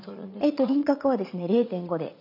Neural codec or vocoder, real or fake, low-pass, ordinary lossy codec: vocoder, 44.1 kHz, 80 mel bands, Vocos; fake; 5.4 kHz; none